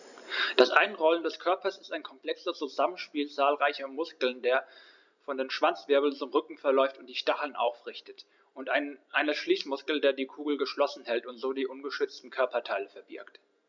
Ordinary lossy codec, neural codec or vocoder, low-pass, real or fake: none; none; 7.2 kHz; real